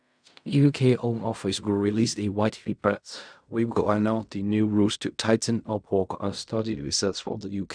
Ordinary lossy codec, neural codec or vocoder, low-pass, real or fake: none; codec, 16 kHz in and 24 kHz out, 0.4 kbps, LongCat-Audio-Codec, fine tuned four codebook decoder; 9.9 kHz; fake